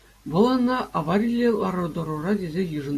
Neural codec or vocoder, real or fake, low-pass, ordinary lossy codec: none; real; 14.4 kHz; AAC, 64 kbps